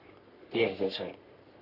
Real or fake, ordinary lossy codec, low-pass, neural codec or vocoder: fake; none; 5.4 kHz; codec, 44.1 kHz, 3.4 kbps, Pupu-Codec